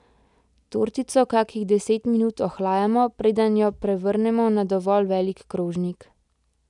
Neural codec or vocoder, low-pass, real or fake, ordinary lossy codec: none; 10.8 kHz; real; none